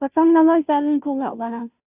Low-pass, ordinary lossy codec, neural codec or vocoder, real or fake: 3.6 kHz; none; codec, 16 kHz, 0.5 kbps, FunCodec, trained on Chinese and English, 25 frames a second; fake